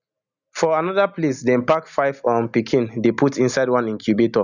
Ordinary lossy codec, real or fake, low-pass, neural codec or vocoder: none; real; 7.2 kHz; none